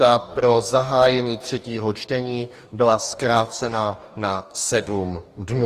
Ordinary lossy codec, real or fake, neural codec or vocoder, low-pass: Opus, 24 kbps; fake; codec, 44.1 kHz, 2.6 kbps, DAC; 14.4 kHz